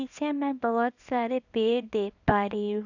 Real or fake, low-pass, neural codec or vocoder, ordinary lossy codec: fake; 7.2 kHz; codec, 24 kHz, 0.9 kbps, WavTokenizer, small release; none